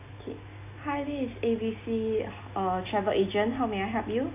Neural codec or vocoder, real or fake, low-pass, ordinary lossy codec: none; real; 3.6 kHz; AAC, 24 kbps